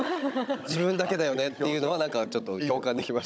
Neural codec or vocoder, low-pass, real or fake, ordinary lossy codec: codec, 16 kHz, 16 kbps, FunCodec, trained on Chinese and English, 50 frames a second; none; fake; none